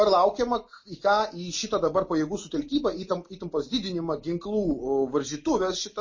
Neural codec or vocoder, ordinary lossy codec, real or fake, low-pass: none; MP3, 32 kbps; real; 7.2 kHz